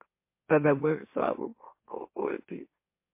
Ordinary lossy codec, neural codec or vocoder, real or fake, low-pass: MP3, 24 kbps; autoencoder, 44.1 kHz, a latent of 192 numbers a frame, MeloTTS; fake; 3.6 kHz